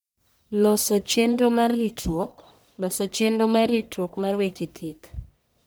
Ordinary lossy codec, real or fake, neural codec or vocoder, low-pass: none; fake; codec, 44.1 kHz, 1.7 kbps, Pupu-Codec; none